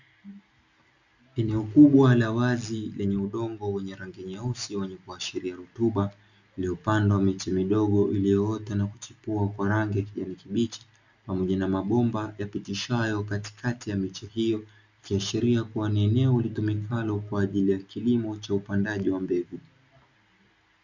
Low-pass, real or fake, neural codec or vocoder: 7.2 kHz; real; none